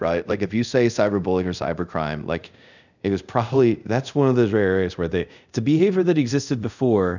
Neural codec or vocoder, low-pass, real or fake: codec, 24 kHz, 0.5 kbps, DualCodec; 7.2 kHz; fake